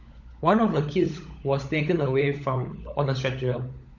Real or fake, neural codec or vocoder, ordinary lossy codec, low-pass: fake; codec, 16 kHz, 16 kbps, FunCodec, trained on LibriTTS, 50 frames a second; none; 7.2 kHz